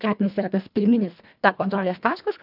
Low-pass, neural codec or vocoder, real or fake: 5.4 kHz; codec, 24 kHz, 1.5 kbps, HILCodec; fake